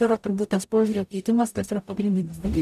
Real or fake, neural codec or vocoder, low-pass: fake; codec, 44.1 kHz, 0.9 kbps, DAC; 14.4 kHz